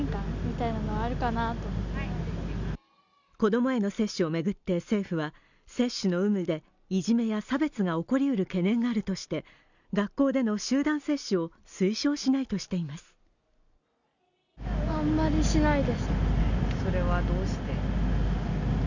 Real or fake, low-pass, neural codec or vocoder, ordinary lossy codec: real; 7.2 kHz; none; none